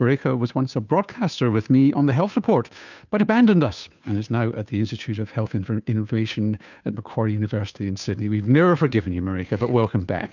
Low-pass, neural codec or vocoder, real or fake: 7.2 kHz; codec, 16 kHz, 2 kbps, FunCodec, trained on Chinese and English, 25 frames a second; fake